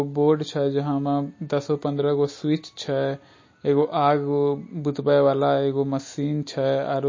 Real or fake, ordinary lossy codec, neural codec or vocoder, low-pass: real; MP3, 32 kbps; none; 7.2 kHz